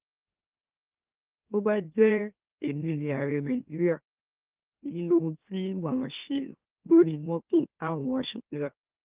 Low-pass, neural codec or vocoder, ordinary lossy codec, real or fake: 3.6 kHz; autoencoder, 44.1 kHz, a latent of 192 numbers a frame, MeloTTS; Opus, 24 kbps; fake